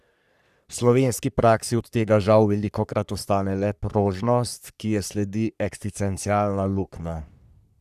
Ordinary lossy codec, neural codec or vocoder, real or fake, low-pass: none; codec, 44.1 kHz, 3.4 kbps, Pupu-Codec; fake; 14.4 kHz